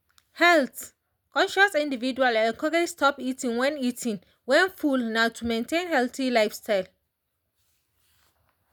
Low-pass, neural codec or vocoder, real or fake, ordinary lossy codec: none; none; real; none